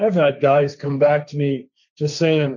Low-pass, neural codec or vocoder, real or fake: 7.2 kHz; codec, 16 kHz, 1.1 kbps, Voila-Tokenizer; fake